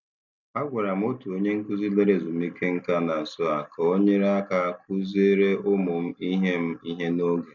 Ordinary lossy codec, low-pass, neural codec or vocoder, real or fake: none; 7.2 kHz; none; real